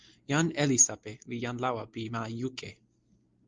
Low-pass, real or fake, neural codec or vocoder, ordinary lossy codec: 7.2 kHz; real; none; Opus, 32 kbps